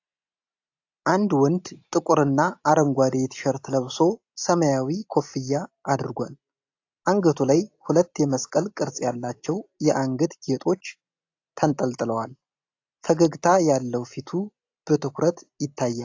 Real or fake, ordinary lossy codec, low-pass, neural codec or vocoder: real; AAC, 48 kbps; 7.2 kHz; none